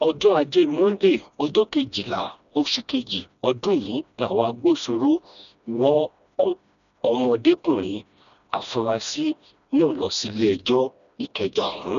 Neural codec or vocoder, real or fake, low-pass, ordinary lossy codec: codec, 16 kHz, 1 kbps, FreqCodec, smaller model; fake; 7.2 kHz; none